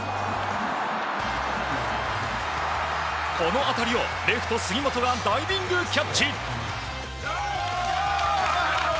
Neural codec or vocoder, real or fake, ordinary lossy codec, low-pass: none; real; none; none